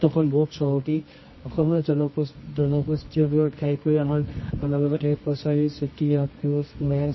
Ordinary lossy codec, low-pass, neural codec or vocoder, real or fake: MP3, 24 kbps; 7.2 kHz; codec, 24 kHz, 0.9 kbps, WavTokenizer, medium music audio release; fake